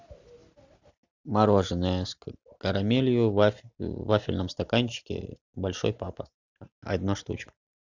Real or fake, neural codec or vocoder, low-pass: real; none; 7.2 kHz